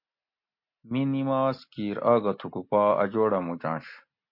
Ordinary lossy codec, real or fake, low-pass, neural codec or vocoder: MP3, 32 kbps; real; 5.4 kHz; none